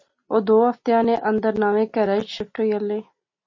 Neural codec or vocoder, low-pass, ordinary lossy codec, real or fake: none; 7.2 kHz; MP3, 32 kbps; real